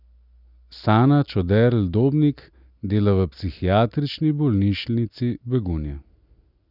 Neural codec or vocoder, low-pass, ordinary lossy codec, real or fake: none; 5.4 kHz; none; real